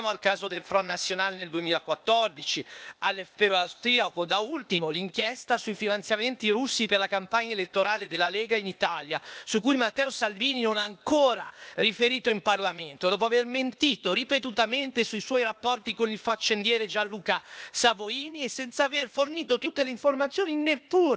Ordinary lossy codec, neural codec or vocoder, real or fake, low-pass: none; codec, 16 kHz, 0.8 kbps, ZipCodec; fake; none